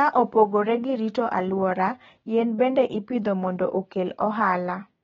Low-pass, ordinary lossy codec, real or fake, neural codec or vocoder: 7.2 kHz; AAC, 24 kbps; fake; codec, 16 kHz, 6 kbps, DAC